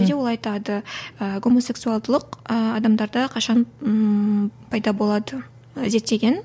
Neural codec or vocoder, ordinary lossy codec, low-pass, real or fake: none; none; none; real